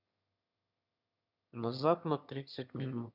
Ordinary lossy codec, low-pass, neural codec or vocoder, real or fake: none; 5.4 kHz; autoencoder, 22.05 kHz, a latent of 192 numbers a frame, VITS, trained on one speaker; fake